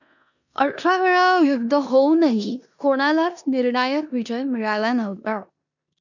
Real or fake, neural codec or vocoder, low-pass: fake; codec, 16 kHz in and 24 kHz out, 0.9 kbps, LongCat-Audio-Codec, four codebook decoder; 7.2 kHz